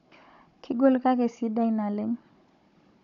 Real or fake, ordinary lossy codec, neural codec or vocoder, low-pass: fake; none; codec, 16 kHz, 16 kbps, FunCodec, trained on Chinese and English, 50 frames a second; 7.2 kHz